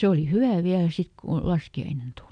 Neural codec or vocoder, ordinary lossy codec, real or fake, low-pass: none; MP3, 64 kbps; real; 9.9 kHz